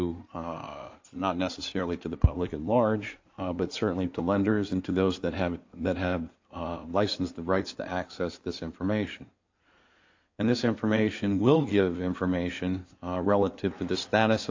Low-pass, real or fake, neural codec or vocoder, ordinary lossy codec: 7.2 kHz; fake; codec, 16 kHz in and 24 kHz out, 2.2 kbps, FireRedTTS-2 codec; AAC, 48 kbps